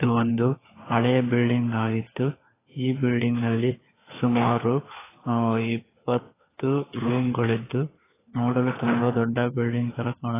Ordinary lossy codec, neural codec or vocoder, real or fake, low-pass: AAC, 16 kbps; codec, 16 kHz, 4 kbps, FunCodec, trained on LibriTTS, 50 frames a second; fake; 3.6 kHz